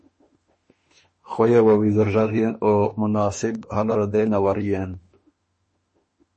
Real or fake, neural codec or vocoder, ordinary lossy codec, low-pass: fake; autoencoder, 48 kHz, 32 numbers a frame, DAC-VAE, trained on Japanese speech; MP3, 32 kbps; 10.8 kHz